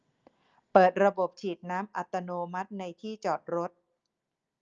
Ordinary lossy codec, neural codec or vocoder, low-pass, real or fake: Opus, 24 kbps; none; 7.2 kHz; real